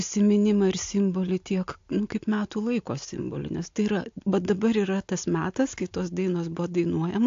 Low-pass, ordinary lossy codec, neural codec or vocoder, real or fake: 7.2 kHz; AAC, 48 kbps; none; real